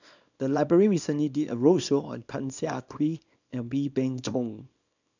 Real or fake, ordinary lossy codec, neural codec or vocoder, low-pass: fake; none; codec, 24 kHz, 0.9 kbps, WavTokenizer, small release; 7.2 kHz